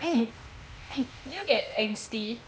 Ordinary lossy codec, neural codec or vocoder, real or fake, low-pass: none; codec, 16 kHz, 0.8 kbps, ZipCodec; fake; none